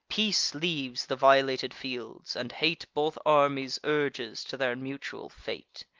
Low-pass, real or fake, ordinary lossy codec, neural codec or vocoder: 7.2 kHz; real; Opus, 32 kbps; none